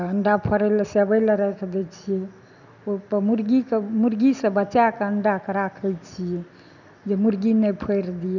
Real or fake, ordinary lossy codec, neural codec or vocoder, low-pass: real; none; none; 7.2 kHz